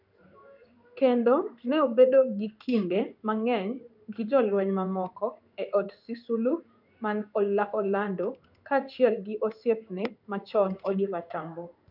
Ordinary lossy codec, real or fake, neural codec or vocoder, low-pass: none; fake; codec, 16 kHz in and 24 kHz out, 1 kbps, XY-Tokenizer; 5.4 kHz